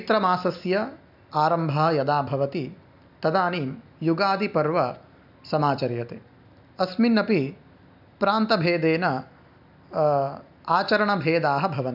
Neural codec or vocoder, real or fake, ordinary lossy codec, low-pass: none; real; none; 5.4 kHz